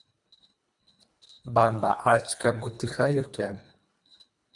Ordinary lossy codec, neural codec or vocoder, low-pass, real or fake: MP3, 96 kbps; codec, 24 kHz, 1.5 kbps, HILCodec; 10.8 kHz; fake